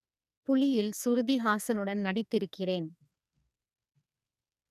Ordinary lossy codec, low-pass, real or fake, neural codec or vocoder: none; 14.4 kHz; fake; codec, 44.1 kHz, 2.6 kbps, SNAC